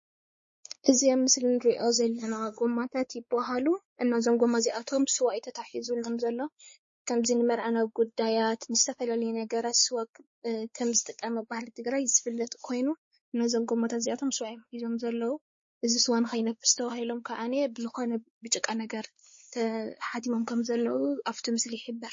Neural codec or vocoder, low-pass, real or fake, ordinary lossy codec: codec, 16 kHz, 4 kbps, X-Codec, WavLM features, trained on Multilingual LibriSpeech; 7.2 kHz; fake; MP3, 32 kbps